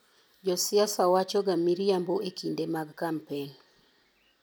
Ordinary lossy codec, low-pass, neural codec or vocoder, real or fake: none; none; none; real